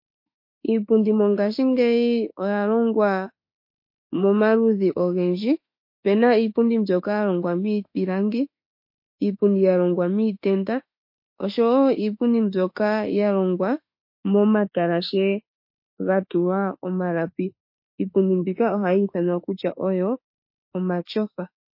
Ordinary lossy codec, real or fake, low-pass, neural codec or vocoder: MP3, 32 kbps; fake; 5.4 kHz; autoencoder, 48 kHz, 32 numbers a frame, DAC-VAE, trained on Japanese speech